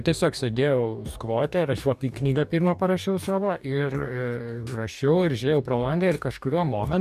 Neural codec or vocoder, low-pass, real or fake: codec, 44.1 kHz, 2.6 kbps, DAC; 14.4 kHz; fake